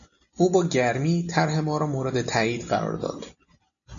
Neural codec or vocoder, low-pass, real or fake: none; 7.2 kHz; real